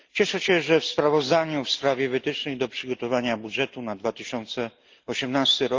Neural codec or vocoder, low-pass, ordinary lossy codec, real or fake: none; 7.2 kHz; Opus, 24 kbps; real